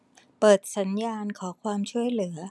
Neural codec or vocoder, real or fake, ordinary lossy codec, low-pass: none; real; none; none